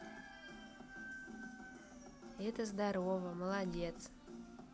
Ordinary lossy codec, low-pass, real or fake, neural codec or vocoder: none; none; real; none